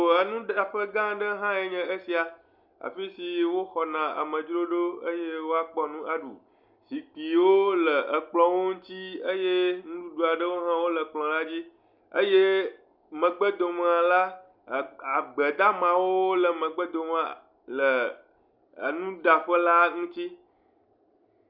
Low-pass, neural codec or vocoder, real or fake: 5.4 kHz; none; real